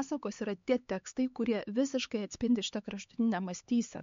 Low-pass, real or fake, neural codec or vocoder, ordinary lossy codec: 7.2 kHz; fake; codec, 16 kHz, 4 kbps, X-Codec, HuBERT features, trained on LibriSpeech; MP3, 48 kbps